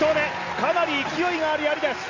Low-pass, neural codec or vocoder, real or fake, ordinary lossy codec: 7.2 kHz; none; real; Opus, 64 kbps